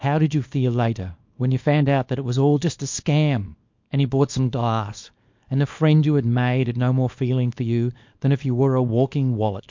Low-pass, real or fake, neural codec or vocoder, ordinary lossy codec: 7.2 kHz; fake; codec, 24 kHz, 0.9 kbps, WavTokenizer, small release; MP3, 48 kbps